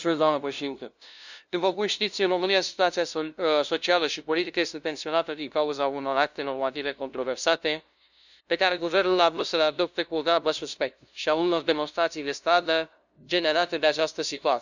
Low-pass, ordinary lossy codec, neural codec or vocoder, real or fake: 7.2 kHz; none; codec, 16 kHz, 0.5 kbps, FunCodec, trained on LibriTTS, 25 frames a second; fake